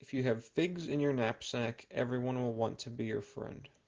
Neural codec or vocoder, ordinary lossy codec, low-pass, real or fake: none; Opus, 16 kbps; 7.2 kHz; real